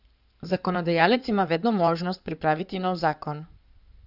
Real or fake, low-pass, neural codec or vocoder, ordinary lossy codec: fake; 5.4 kHz; codec, 16 kHz in and 24 kHz out, 2.2 kbps, FireRedTTS-2 codec; none